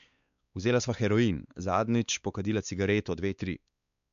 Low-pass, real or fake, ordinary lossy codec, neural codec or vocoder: 7.2 kHz; fake; none; codec, 16 kHz, 4 kbps, X-Codec, WavLM features, trained on Multilingual LibriSpeech